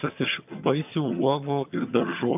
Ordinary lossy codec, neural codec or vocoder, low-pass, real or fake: AAC, 32 kbps; vocoder, 22.05 kHz, 80 mel bands, HiFi-GAN; 3.6 kHz; fake